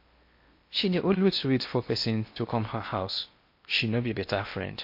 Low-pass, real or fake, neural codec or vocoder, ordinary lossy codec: 5.4 kHz; fake; codec, 16 kHz in and 24 kHz out, 0.8 kbps, FocalCodec, streaming, 65536 codes; MP3, 32 kbps